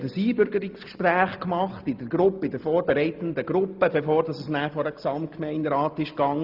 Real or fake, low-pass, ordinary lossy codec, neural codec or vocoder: real; 5.4 kHz; Opus, 24 kbps; none